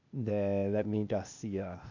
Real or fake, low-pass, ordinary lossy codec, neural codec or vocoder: fake; 7.2 kHz; none; codec, 16 kHz, 0.8 kbps, ZipCodec